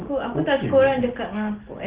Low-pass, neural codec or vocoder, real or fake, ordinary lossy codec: 3.6 kHz; none; real; Opus, 16 kbps